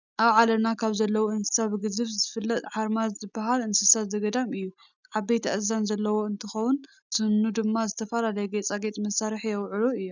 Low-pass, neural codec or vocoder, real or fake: 7.2 kHz; none; real